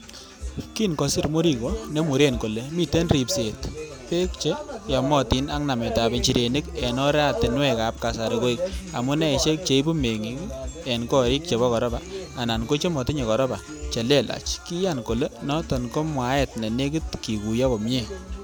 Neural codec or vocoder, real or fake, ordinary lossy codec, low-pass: none; real; none; none